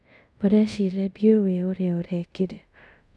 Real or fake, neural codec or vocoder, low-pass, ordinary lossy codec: fake; codec, 24 kHz, 0.5 kbps, DualCodec; none; none